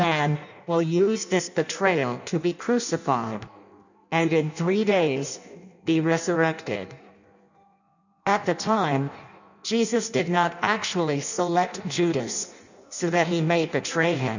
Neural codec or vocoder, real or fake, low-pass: codec, 16 kHz in and 24 kHz out, 0.6 kbps, FireRedTTS-2 codec; fake; 7.2 kHz